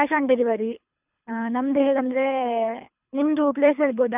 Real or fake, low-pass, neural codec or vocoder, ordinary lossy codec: fake; 3.6 kHz; codec, 24 kHz, 3 kbps, HILCodec; none